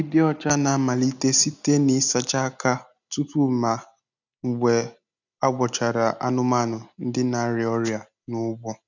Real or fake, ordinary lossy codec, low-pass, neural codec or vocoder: real; none; 7.2 kHz; none